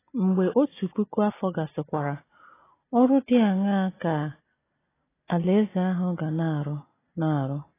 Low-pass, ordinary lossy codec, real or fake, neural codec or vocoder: 3.6 kHz; AAC, 16 kbps; real; none